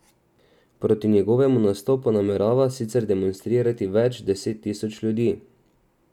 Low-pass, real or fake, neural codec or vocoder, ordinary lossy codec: 19.8 kHz; real; none; none